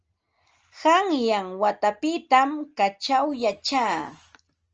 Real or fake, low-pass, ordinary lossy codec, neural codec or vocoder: real; 7.2 kHz; Opus, 24 kbps; none